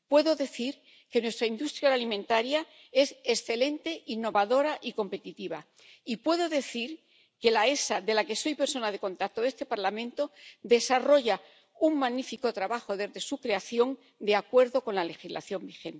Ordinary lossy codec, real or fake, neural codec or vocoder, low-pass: none; real; none; none